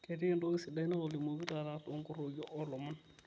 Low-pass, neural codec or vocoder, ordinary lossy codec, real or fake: none; none; none; real